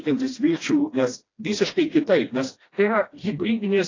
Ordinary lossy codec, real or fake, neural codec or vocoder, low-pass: AAC, 32 kbps; fake; codec, 16 kHz, 1 kbps, FreqCodec, smaller model; 7.2 kHz